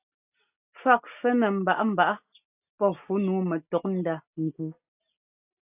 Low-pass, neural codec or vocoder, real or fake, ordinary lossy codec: 3.6 kHz; none; real; AAC, 32 kbps